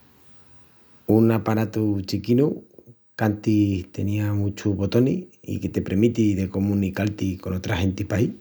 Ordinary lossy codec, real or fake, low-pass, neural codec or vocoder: none; real; none; none